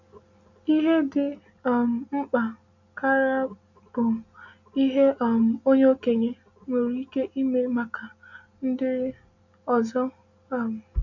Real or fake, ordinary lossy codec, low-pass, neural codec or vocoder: real; none; 7.2 kHz; none